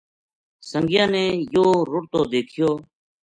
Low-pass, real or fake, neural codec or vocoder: 9.9 kHz; real; none